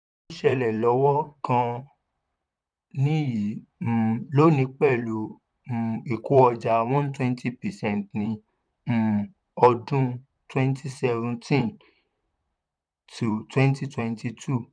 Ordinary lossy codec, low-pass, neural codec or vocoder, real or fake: none; 9.9 kHz; vocoder, 44.1 kHz, 128 mel bands, Pupu-Vocoder; fake